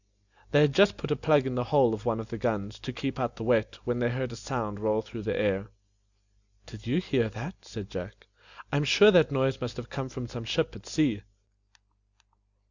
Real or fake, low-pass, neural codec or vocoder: real; 7.2 kHz; none